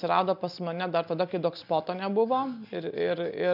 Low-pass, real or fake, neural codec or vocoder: 5.4 kHz; real; none